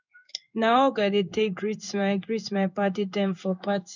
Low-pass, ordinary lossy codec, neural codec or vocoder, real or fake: 7.2 kHz; none; codec, 16 kHz in and 24 kHz out, 1 kbps, XY-Tokenizer; fake